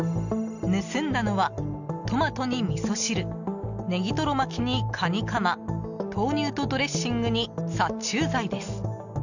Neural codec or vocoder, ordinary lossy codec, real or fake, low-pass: none; none; real; 7.2 kHz